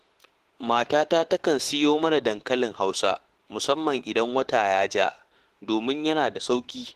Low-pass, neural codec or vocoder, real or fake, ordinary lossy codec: 14.4 kHz; autoencoder, 48 kHz, 32 numbers a frame, DAC-VAE, trained on Japanese speech; fake; Opus, 16 kbps